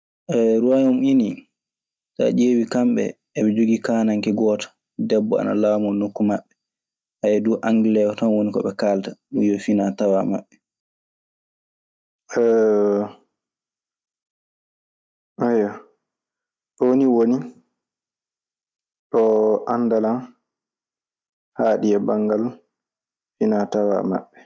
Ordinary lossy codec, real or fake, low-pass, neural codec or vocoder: none; real; none; none